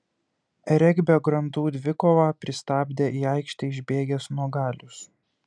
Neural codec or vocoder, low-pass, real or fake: none; 9.9 kHz; real